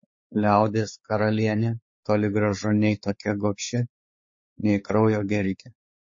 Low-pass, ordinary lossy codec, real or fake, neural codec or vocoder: 7.2 kHz; MP3, 32 kbps; fake; codec, 16 kHz, 4 kbps, X-Codec, WavLM features, trained on Multilingual LibriSpeech